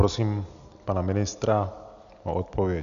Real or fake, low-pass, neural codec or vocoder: real; 7.2 kHz; none